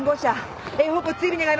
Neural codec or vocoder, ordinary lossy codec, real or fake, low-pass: none; none; real; none